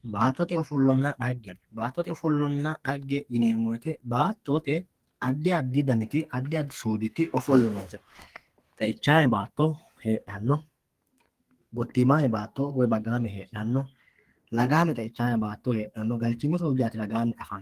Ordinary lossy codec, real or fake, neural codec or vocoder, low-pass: Opus, 24 kbps; fake; codec, 32 kHz, 1.9 kbps, SNAC; 14.4 kHz